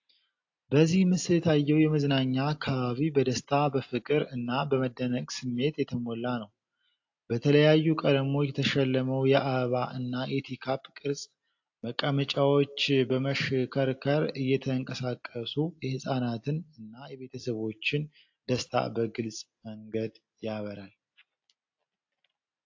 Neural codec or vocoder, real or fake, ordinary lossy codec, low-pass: none; real; AAC, 48 kbps; 7.2 kHz